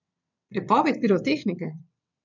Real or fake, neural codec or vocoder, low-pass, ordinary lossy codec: fake; vocoder, 22.05 kHz, 80 mel bands, WaveNeXt; 7.2 kHz; none